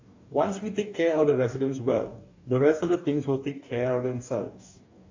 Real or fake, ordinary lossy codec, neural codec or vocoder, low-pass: fake; AAC, 48 kbps; codec, 44.1 kHz, 2.6 kbps, DAC; 7.2 kHz